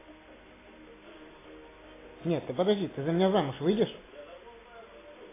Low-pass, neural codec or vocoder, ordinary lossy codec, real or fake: 3.6 kHz; none; AAC, 16 kbps; real